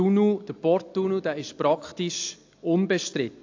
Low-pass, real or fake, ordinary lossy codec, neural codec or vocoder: 7.2 kHz; real; AAC, 48 kbps; none